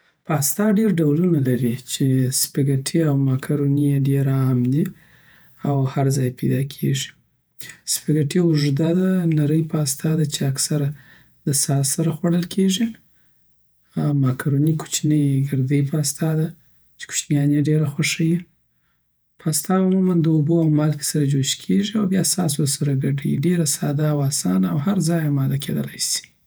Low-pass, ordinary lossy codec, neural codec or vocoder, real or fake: none; none; vocoder, 48 kHz, 128 mel bands, Vocos; fake